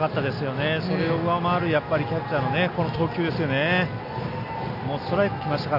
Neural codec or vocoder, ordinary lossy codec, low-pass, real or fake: none; none; 5.4 kHz; real